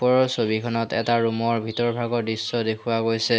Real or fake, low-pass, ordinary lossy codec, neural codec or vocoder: real; none; none; none